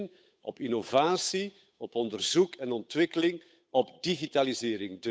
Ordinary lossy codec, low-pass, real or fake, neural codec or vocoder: none; none; fake; codec, 16 kHz, 8 kbps, FunCodec, trained on Chinese and English, 25 frames a second